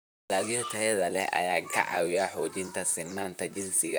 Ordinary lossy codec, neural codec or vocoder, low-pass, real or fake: none; vocoder, 44.1 kHz, 128 mel bands, Pupu-Vocoder; none; fake